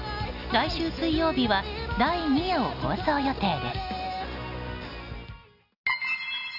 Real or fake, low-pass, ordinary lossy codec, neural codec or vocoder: real; 5.4 kHz; none; none